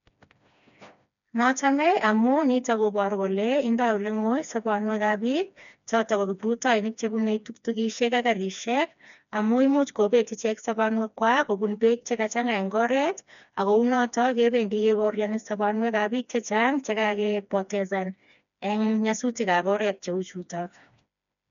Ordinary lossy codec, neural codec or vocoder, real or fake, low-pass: none; codec, 16 kHz, 2 kbps, FreqCodec, smaller model; fake; 7.2 kHz